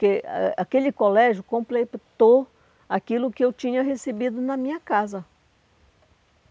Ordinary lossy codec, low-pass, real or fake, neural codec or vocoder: none; none; real; none